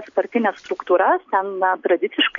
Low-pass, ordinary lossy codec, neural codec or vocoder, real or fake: 7.2 kHz; MP3, 64 kbps; none; real